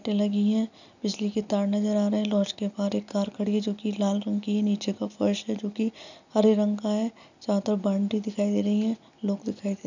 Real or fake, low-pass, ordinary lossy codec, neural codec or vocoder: real; 7.2 kHz; none; none